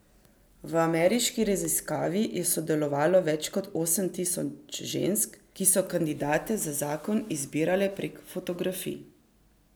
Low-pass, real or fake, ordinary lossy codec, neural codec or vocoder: none; real; none; none